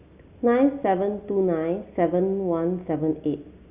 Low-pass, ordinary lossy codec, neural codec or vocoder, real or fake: 3.6 kHz; Opus, 64 kbps; none; real